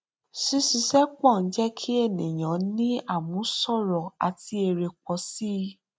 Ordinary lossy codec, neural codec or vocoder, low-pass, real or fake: none; none; none; real